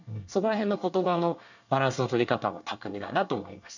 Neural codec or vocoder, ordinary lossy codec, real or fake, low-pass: codec, 24 kHz, 1 kbps, SNAC; none; fake; 7.2 kHz